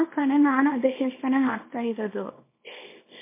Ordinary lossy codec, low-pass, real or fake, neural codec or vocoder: MP3, 24 kbps; 3.6 kHz; fake; codec, 24 kHz, 0.9 kbps, WavTokenizer, small release